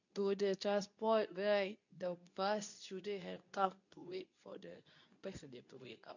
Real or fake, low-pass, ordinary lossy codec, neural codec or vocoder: fake; 7.2 kHz; none; codec, 24 kHz, 0.9 kbps, WavTokenizer, medium speech release version 2